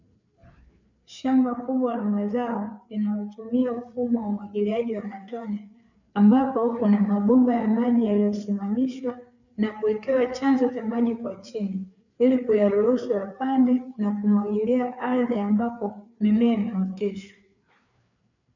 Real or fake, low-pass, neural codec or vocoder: fake; 7.2 kHz; codec, 16 kHz, 4 kbps, FreqCodec, larger model